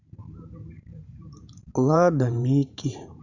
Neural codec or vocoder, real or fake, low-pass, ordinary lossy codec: vocoder, 44.1 kHz, 128 mel bands every 512 samples, BigVGAN v2; fake; 7.2 kHz; none